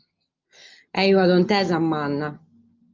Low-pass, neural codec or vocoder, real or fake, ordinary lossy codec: 7.2 kHz; none; real; Opus, 24 kbps